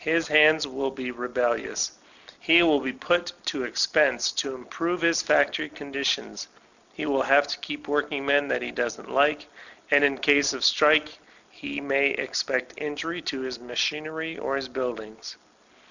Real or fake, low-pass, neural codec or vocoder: real; 7.2 kHz; none